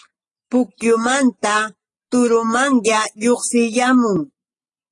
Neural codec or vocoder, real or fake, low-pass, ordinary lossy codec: none; real; 10.8 kHz; AAC, 32 kbps